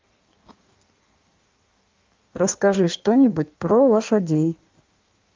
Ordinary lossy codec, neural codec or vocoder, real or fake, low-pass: Opus, 24 kbps; codec, 16 kHz in and 24 kHz out, 1.1 kbps, FireRedTTS-2 codec; fake; 7.2 kHz